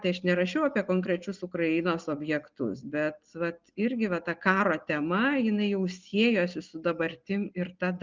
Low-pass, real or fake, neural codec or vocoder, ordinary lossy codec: 7.2 kHz; real; none; Opus, 24 kbps